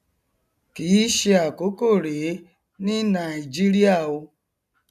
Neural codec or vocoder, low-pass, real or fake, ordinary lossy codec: none; 14.4 kHz; real; none